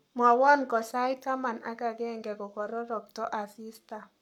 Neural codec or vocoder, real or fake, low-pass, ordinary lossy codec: codec, 44.1 kHz, 7.8 kbps, Pupu-Codec; fake; 19.8 kHz; none